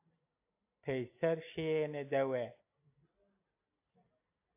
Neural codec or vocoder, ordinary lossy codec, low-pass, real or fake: none; AAC, 24 kbps; 3.6 kHz; real